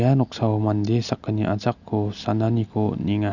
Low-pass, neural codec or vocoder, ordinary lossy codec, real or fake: 7.2 kHz; none; none; real